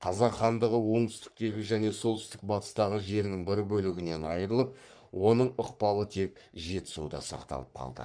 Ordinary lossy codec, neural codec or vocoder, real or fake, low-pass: none; codec, 44.1 kHz, 3.4 kbps, Pupu-Codec; fake; 9.9 kHz